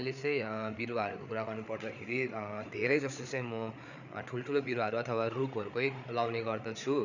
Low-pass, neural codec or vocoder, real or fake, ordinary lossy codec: 7.2 kHz; codec, 16 kHz, 8 kbps, FreqCodec, larger model; fake; none